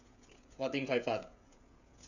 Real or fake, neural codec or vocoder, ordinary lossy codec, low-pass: fake; codec, 16 kHz, 16 kbps, FreqCodec, smaller model; none; 7.2 kHz